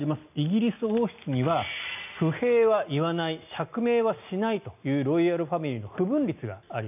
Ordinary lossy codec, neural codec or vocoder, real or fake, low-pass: none; none; real; 3.6 kHz